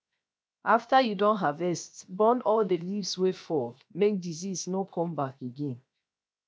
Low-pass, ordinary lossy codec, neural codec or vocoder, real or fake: none; none; codec, 16 kHz, 0.7 kbps, FocalCodec; fake